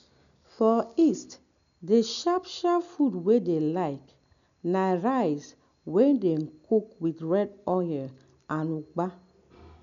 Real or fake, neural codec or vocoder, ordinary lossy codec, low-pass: real; none; none; 7.2 kHz